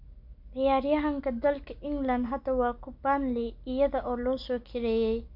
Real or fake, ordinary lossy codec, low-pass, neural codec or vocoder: real; none; 5.4 kHz; none